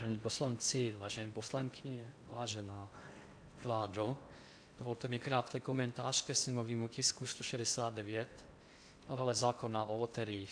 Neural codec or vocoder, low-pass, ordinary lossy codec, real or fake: codec, 16 kHz in and 24 kHz out, 0.6 kbps, FocalCodec, streaming, 4096 codes; 9.9 kHz; AAC, 64 kbps; fake